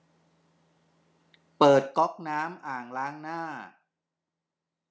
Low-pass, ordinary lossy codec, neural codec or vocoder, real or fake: none; none; none; real